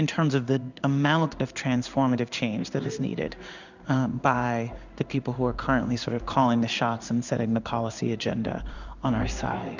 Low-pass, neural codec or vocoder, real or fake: 7.2 kHz; codec, 16 kHz in and 24 kHz out, 1 kbps, XY-Tokenizer; fake